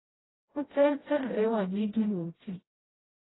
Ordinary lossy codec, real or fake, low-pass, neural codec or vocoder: AAC, 16 kbps; fake; 7.2 kHz; codec, 16 kHz, 0.5 kbps, FreqCodec, smaller model